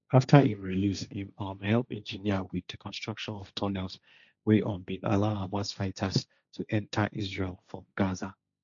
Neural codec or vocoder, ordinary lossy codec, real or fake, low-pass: codec, 16 kHz, 1.1 kbps, Voila-Tokenizer; none; fake; 7.2 kHz